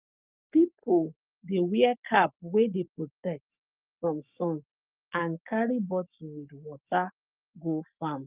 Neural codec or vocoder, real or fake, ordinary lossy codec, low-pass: codec, 44.1 kHz, 7.8 kbps, Pupu-Codec; fake; Opus, 24 kbps; 3.6 kHz